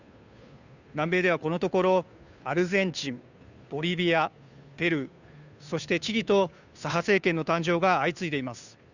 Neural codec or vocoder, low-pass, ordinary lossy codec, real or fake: codec, 16 kHz, 2 kbps, FunCodec, trained on Chinese and English, 25 frames a second; 7.2 kHz; none; fake